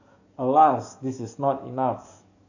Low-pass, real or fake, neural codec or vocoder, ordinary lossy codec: 7.2 kHz; fake; codec, 44.1 kHz, 7.8 kbps, DAC; none